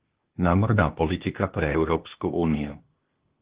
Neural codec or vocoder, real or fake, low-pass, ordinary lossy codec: codec, 16 kHz, 0.8 kbps, ZipCodec; fake; 3.6 kHz; Opus, 16 kbps